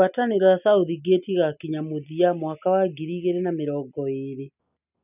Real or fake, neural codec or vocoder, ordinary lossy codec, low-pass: real; none; none; 3.6 kHz